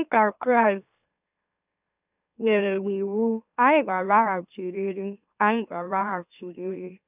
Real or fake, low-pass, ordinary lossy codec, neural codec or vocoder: fake; 3.6 kHz; none; autoencoder, 44.1 kHz, a latent of 192 numbers a frame, MeloTTS